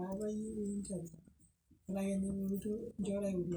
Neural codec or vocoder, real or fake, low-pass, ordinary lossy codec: none; real; none; none